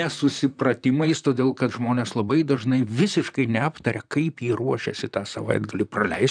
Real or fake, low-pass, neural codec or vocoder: fake; 9.9 kHz; vocoder, 44.1 kHz, 128 mel bands, Pupu-Vocoder